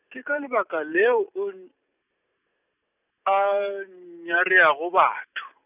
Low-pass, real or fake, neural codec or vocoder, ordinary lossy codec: 3.6 kHz; real; none; none